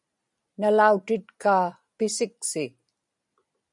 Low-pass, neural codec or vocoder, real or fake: 10.8 kHz; none; real